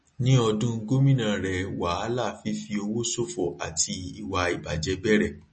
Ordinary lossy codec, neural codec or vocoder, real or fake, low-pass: MP3, 32 kbps; none; real; 10.8 kHz